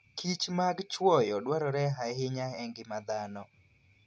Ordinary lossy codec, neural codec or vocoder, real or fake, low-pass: none; none; real; none